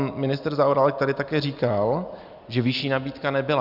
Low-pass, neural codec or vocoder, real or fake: 5.4 kHz; none; real